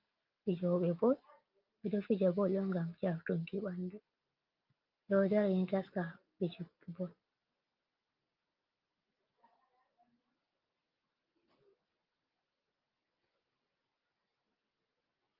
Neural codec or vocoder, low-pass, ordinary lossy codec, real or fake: none; 5.4 kHz; Opus, 24 kbps; real